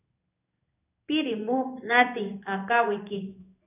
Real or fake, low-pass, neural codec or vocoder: fake; 3.6 kHz; codec, 16 kHz in and 24 kHz out, 1 kbps, XY-Tokenizer